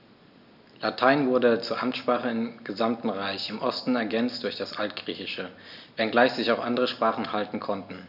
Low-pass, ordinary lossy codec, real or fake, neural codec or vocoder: 5.4 kHz; none; real; none